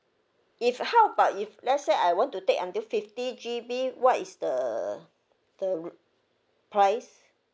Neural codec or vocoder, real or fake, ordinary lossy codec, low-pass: none; real; none; none